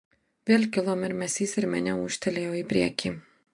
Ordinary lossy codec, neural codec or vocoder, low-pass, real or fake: MP3, 64 kbps; none; 10.8 kHz; real